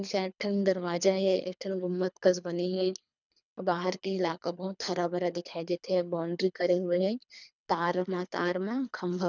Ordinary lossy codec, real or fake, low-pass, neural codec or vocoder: none; fake; 7.2 kHz; codec, 24 kHz, 3 kbps, HILCodec